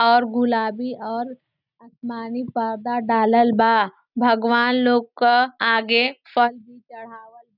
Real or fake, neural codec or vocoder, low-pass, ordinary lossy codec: real; none; 5.4 kHz; none